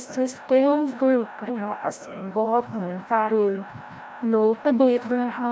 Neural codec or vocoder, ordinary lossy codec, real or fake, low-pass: codec, 16 kHz, 0.5 kbps, FreqCodec, larger model; none; fake; none